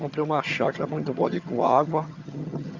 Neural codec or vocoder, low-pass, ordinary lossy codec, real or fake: vocoder, 22.05 kHz, 80 mel bands, HiFi-GAN; 7.2 kHz; Opus, 64 kbps; fake